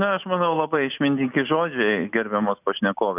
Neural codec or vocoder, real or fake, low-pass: none; real; 3.6 kHz